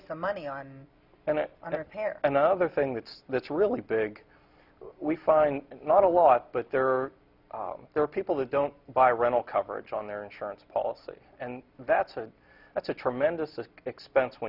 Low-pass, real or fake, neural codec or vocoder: 5.4 kHz; real; none